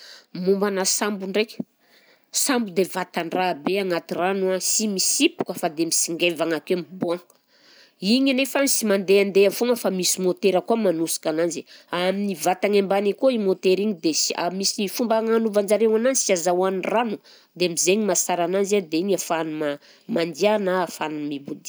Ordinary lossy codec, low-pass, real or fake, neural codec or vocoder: none; none; real; none